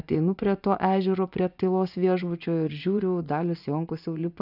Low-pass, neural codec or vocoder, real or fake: 5.4 kHz; vocoder, 24 kHz, 100 mel bands, Vocos; fake